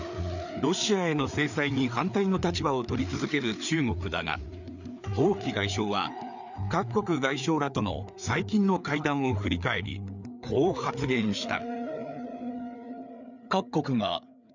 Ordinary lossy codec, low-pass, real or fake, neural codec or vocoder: none; 7.2 kHz; fake; codec, 16 kHz, 4 kbps, FreqCodec, larger model